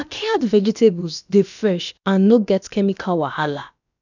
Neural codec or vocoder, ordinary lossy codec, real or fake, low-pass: codec, 16 kHz, about 1 kbps, DyCAST, with the encoder's durations; none; fake; 7.2 kHz